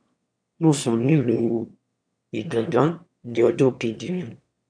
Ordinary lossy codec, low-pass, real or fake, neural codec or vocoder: AAC, 64 kbps; 9.9 kHz; fake; autoencoder, 22.05 kHz, a latent of 192 numbers a frame, VITS, trained on one speaker